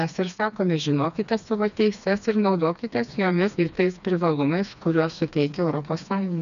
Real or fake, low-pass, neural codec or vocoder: fake; 7.2 kHz; codec, 16 kHz, 2 kbps, FreqCodec, smaller model